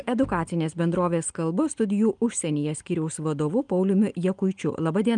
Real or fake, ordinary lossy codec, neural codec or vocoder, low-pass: real; Opus, 32 kbps; none; 9.9 kHz